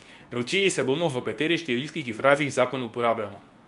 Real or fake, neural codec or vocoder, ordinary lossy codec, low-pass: fake; codec, 24 kHz, 0.9 kbps, WavTokenizer, medium speech release version 2; none; 10.8 kHz